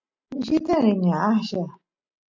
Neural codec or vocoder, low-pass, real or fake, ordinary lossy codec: none; 7.2 kHz; real; MP3, 64 kbps